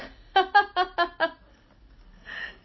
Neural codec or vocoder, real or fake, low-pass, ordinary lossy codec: none; real; 7.2 kHz; MP3, 24 kbps